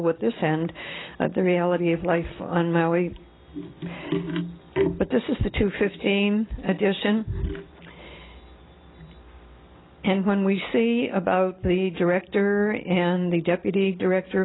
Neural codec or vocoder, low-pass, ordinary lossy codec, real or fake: none; 7.2 kHz; AAC, 16 kbps; real